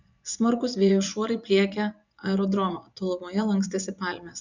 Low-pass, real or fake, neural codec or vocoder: 7.2 kHz; fake; vocoder, 24 kHz, 100 mel bands, Vocos